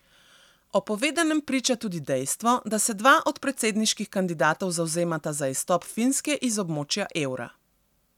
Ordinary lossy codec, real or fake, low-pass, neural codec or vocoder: none; real; 19.8 kHz; none